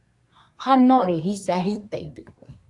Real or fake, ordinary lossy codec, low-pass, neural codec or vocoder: fake; AAC, 64 kbps; 10.8 kHz; codec, 24 kHz, 1 kbps, SNAC